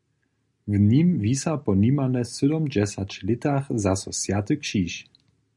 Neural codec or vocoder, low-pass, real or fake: none; 10.8 kHz; real